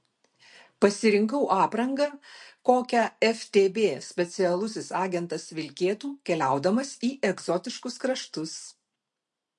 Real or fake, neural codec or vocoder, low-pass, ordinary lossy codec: real; none; 10.8 kHz; MP3, 48 kbps